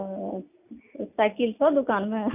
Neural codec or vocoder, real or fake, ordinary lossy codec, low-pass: none; real; none; 3.6 kHz